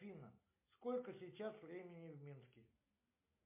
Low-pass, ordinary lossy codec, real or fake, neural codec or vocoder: 3.6 kHz; MP3, 32 kbps; real; none